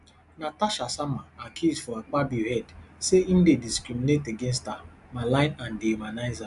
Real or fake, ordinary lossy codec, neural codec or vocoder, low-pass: real; AAC, 96 kbps; none; 10.8 kHz